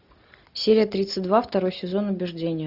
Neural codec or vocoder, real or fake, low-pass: none; real; 5.4 kHz